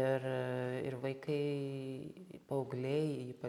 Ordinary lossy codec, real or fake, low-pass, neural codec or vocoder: MP3, 96 kbps; real; 19.8 kHz; none